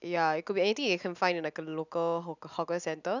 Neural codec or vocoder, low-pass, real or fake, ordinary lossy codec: none; 7.2 kHz; real; none